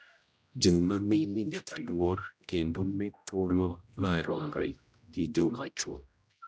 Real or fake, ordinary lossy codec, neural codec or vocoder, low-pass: fake; none; codec, 16 kHz, 0.5 kbps, X-Codec, HuBERT features, trained on general audio; none